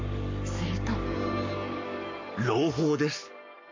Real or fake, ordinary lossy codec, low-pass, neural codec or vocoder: fake; MP3, 64 kbps; 7.2 kHz; codec, 44.1 kHz, 7.8 kbps, Pupu-Codec